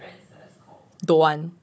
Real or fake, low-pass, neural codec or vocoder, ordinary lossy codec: fake; none; codec, 16 kHz, 16 kbps, FunCodec, trained on Chinese and English, 50 frames a second; none